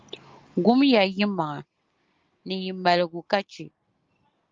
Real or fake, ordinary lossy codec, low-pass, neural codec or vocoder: real; Opus, 32 kbps; 7.2 kHz; none